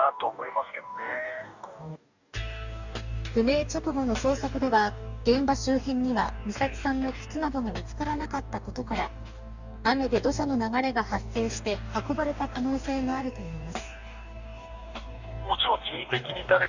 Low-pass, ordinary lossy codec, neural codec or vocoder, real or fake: 7.2 kHz; none; codec, 44.1 kHz, 2.6 kbps, DAC; fake